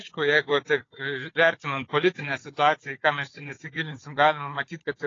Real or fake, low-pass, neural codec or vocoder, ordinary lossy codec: fake; 7.2 kHz; codec, 16 kHz, 4 kbps, FunCodec, trained on Chinese and English, 50 frames a second; AAC, 32 kbps